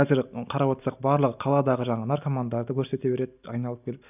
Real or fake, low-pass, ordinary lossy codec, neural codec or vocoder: real; 3.6 kHz; none; none